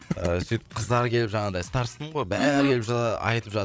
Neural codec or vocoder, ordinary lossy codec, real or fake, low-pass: codec, 16 kHz, 8 kbps, FreqCodec, larger model; none; fake; none